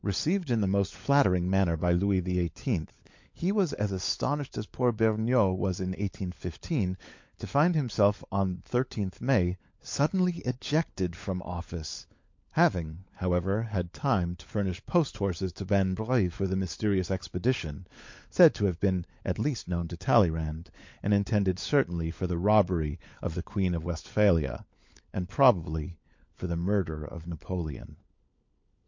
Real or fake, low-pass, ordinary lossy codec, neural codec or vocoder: fake; 7.2 kHz; MP3, 48 kbps; codec, 16 kHz, 16 kbps, FunCodec, trained on LibriTTS, 50 frames a second